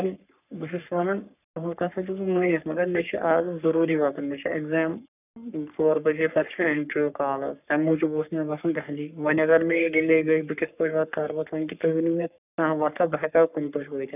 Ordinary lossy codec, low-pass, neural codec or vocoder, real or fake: none; 3.6 kHz; codec, 44.1 kHz, 3.4 kbps, Pupu-Codec; fake